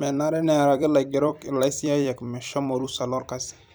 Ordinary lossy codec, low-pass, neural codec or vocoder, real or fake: none; none; vocoder, 44.1 kHz, 128 mel bands every 256 samples, BigVGAN v2; fake